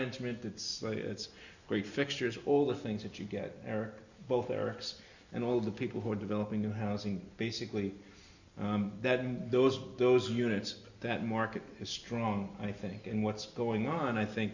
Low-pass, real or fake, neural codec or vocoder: 7.2 kHz; real; none